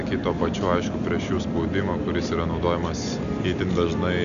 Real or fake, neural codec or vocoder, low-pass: real; none; 7.2 kHz